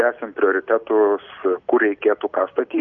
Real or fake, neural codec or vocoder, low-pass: real; none; 7.2 kHz